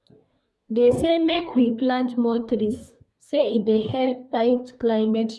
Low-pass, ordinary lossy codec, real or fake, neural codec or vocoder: none; none; fake; codec, 24 kHz, 1 kbps, SNAC